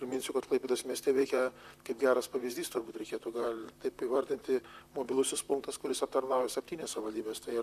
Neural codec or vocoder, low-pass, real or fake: vocoder, 44.1 kHz, 128 mel bands, Pupu-Vocoder; 14.4 kHz; fake